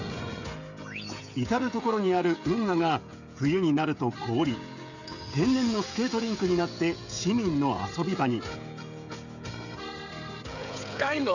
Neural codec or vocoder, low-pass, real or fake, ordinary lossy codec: codec, 16 kHz, 16 kbps, FreqCodec, smaller model; 7.2 kHz; fake; none